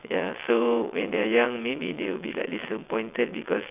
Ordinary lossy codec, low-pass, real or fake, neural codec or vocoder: none; 3.6 kHz; fake; vocoder, 22.05 kHz, 80 mel bands, WaveNeXt